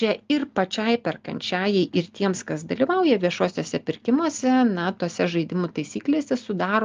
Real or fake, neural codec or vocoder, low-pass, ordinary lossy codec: real; none; 7.2 kHz; Opus, 32 kbps